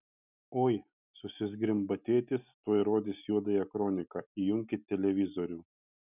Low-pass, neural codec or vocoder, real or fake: 3.6 kHz; none; real